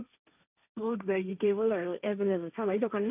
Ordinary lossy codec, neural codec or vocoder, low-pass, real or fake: none; codec, 16 kHz, 1.1 kbps, Voila-Tokenizer; 3.6 kHz; fake